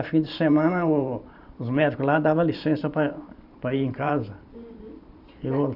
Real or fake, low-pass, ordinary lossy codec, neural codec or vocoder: fake; 5.4 kHz; none; vocoder, 44.1 kHz, 128 mel bands every 512 samples, BigVGAN v2